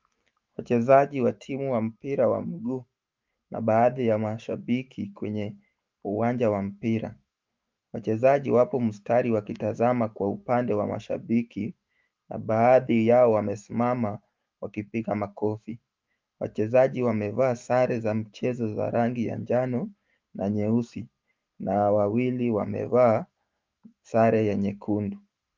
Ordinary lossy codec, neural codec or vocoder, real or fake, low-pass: Opus, 24 kbps; autoencoder, 48 kHz, 128 numbers a frame, DAC-VAE, trained on Japanese speech; fake; 7.2 kHz